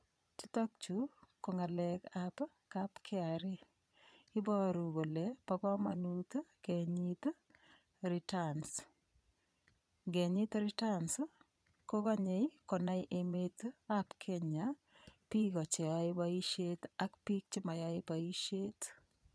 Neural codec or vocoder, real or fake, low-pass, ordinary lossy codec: vocoder, 22.05 kHz, 80 mel bands, Vocos; fake; none; none